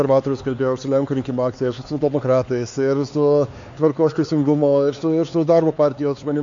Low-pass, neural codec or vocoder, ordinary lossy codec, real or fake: 7.2 kHz; codec, 16 kHz, 4 kbps, X-Codec, HuBERT features, trained on LibriSpeech; AAC, 64 kbps; fake